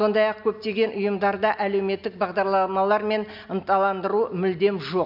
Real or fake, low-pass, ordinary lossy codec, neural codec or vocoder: real; 5.4 kHz; none; none